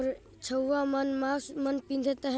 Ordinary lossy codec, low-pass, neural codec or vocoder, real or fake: none; none; none; real